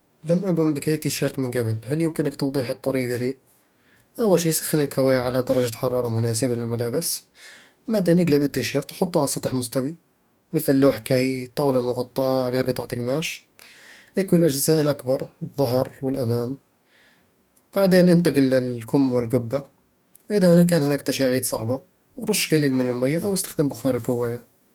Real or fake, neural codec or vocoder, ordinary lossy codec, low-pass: fake; codec, 44.1 kHz, 2.6 kbps, DAC; none; 19.8 kHz